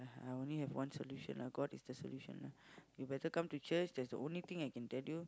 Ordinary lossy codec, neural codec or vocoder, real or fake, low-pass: none; none; real; none